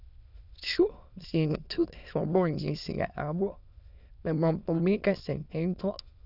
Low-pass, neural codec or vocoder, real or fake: 5.4 kHz; autoencoder, 22.05 kHz, a latent of 192 numbers a frame, VITS, trained on many speakers; fake